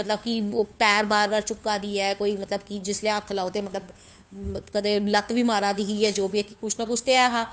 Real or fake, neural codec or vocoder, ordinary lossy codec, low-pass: fake; codec, 16 kHz, 2 kbps, FunCodec, trained on Chinese and English, 25 frames a second; none; none